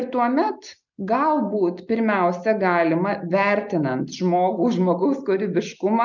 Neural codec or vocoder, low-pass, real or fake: none; 7.2 kHz; real